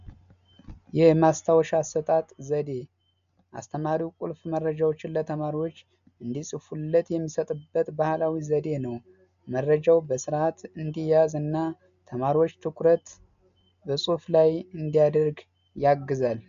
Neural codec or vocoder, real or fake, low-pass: none; real; 7.2 kHz